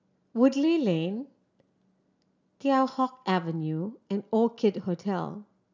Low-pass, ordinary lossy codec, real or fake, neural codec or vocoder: 7.2 kHz; none; real; none